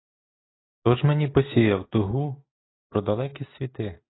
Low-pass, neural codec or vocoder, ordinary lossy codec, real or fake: 7.2 kHz; none; AAC, 16 kbps; real